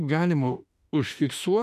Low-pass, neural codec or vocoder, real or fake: 14.4 kHz; autoencoder, 48 kHz, 32 numbers a frame, DAC-VAE, trained on Japanese speech; fake